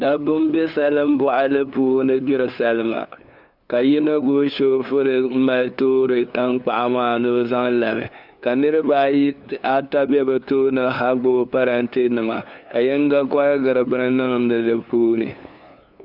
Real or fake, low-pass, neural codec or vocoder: fake; 5.4 kHz; codec, 16 kHz, 2 kbps, FunCodec, trained on LibriTTS, 25 frames a second